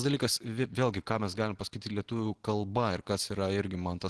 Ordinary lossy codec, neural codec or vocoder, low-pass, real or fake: Opus, 16 kbps; none; 10.8 kHz; real